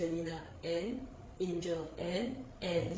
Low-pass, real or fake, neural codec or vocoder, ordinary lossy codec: none; fake; codec, 16 kHz, 8 kbps, FreqCodec, larger model; none